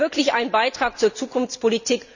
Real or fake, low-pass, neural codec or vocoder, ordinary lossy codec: real; 7.2 kHz; none; none